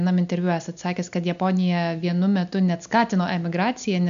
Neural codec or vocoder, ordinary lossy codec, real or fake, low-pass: none; MP3, 96 kbps; real; 7.2 kHz